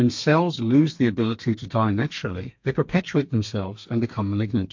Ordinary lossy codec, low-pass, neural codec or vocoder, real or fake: MP3, 48 kbps; 7.2 kHz; codec, 32 kHz, 1.9 kbps, SNAC; fake